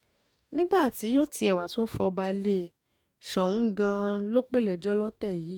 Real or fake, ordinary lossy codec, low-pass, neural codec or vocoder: fake; MP3, 96 kbps; 19.8 kHz; codec, 44.1 kHz, 2.6 kbps, DAC